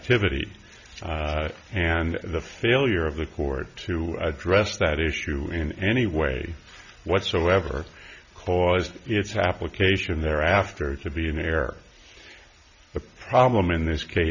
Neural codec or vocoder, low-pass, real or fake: none; 7.2 kHz; real